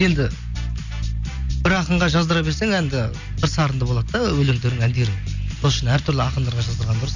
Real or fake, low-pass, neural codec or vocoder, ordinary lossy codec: real; 7.2 kHz; none; none